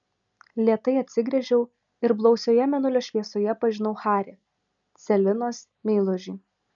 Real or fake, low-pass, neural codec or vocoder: real; 7.2 kHz; none